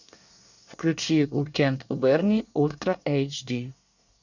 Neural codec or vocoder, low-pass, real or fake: codec, 24 kHz, 1 kbps, SNAC; 7.2 kHz; fake